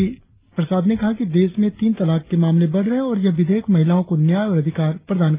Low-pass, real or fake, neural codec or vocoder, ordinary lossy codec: 3.6 kHz; real; none; Opus, 24 kbps